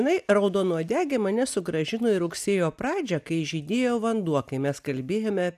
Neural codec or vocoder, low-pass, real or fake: none; 14.4 kHz; real